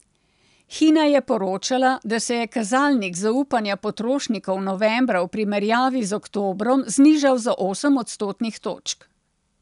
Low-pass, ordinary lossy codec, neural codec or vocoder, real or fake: 10.8 kHz; none; none; real